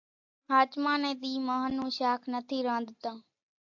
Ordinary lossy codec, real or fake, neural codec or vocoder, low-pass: AAC, 48 kbps; real; none; 7.2 kHz